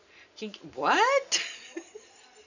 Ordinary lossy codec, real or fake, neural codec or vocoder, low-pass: none; real; none; 7.2 kHz